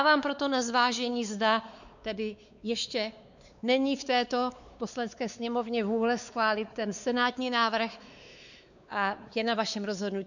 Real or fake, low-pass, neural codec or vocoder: fake; 7.2 kHz; codec, 16 kHz, 4 kbps, X-Codec, WavLM features, trained on Multilingual LibriSpeech